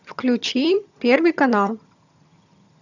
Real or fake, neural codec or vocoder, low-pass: fake; vocoder, 22.05 kHz, 80 mel bands, HiFi-GAN; 7.2 kHz